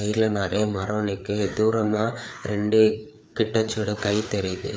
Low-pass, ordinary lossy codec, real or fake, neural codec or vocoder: none; none; fake; codec, 16 kHz, 4 kbps, FreqCodec, larger model